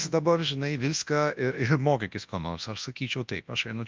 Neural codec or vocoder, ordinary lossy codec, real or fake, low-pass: codec, 24 kHz, 0.9 kbps, WavTokenizer, large speech release; Opus, 24 kbps; fake; 7.2 kHz